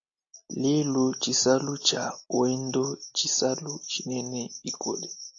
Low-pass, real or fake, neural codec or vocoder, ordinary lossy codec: 7.2 kHz; real; none; AAC, 64 kbps